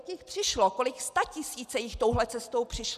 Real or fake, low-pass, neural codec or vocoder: real; 14.4 kHz; none